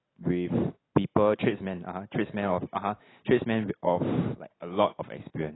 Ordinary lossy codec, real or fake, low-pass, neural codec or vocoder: AAC, 16 kbps; real; 7.2 kHz; none